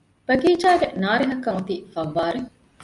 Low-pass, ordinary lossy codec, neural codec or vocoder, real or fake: 10.8 kHz; MP3, 64 kbps; none; real